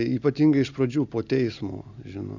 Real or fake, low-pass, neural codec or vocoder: real; 7.2 kHz; none